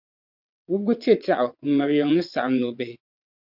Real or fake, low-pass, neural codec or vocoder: fake; 5.4 kHz; vocoder, 22.05 kHz, 80 mel bands, WaveNeXt